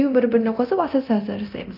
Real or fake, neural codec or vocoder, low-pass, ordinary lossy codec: fake; codec, 24 kHz, 0.9 kbps, DualCodec; 5.4 kHz; none